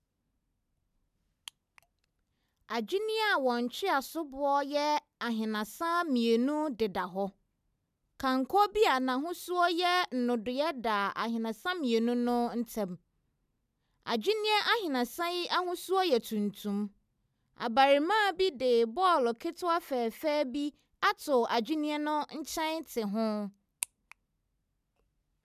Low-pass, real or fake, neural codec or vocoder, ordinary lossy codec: 14.4 kHz; real; none; none